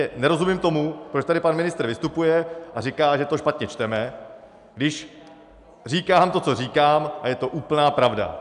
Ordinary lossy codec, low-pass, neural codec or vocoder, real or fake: MP3, 96 kbps; 10.8 kHz; none; real